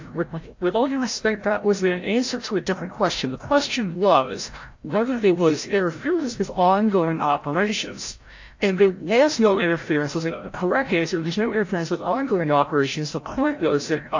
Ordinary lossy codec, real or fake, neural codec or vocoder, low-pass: AAC, 48 kbps; fake; codec, 16 kHz, 0.5 kbps, FreqCodec, larger model; 7.2 kHz